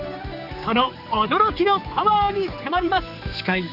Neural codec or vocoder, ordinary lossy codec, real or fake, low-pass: codec, 16 kHz, 4 kbps, X-Codec, HuBERT features, trained on general audio; none; fake; 5.4 kHz